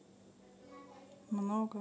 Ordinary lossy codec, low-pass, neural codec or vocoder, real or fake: none; none; none; real